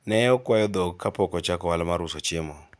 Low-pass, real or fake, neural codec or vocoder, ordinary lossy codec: none; real; none; none